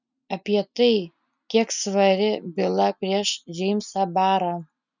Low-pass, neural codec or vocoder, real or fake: 7.2 kHz; none; real